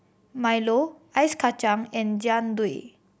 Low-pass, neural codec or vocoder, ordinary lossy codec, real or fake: none; none; none; real